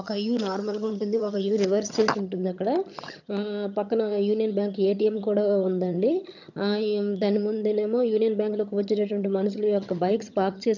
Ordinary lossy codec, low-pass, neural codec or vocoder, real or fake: none; 7.2 kHz; vocoder, 22.05 kHz, 80 mel bands, HiFi-GAN; fake